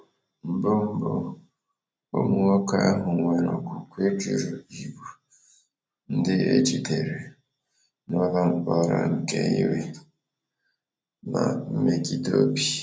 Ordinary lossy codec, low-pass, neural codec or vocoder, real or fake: none; none; none; real